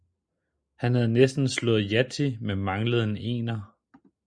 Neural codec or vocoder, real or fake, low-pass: none; real; 9.9 kHz